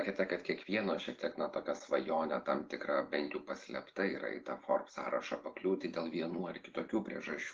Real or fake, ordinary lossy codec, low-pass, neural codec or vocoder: real; Opus, 16 kbps; 7.2 kHz; none